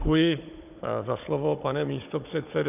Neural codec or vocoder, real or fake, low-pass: codec, 16 kHz, 16 kbps, FunCodec, trained on Chinese and English, 50 frames a second; fake; 3.6 kHz